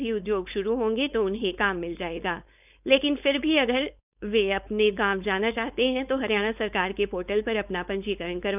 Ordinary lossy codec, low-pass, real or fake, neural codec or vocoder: none; 3.6 kHz; fake; codec, 16 kHz, 4.8 kbps, FACodec